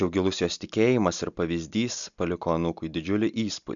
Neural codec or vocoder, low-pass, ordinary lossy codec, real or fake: none; 7.2 kHz; MP3, 96 kbps; real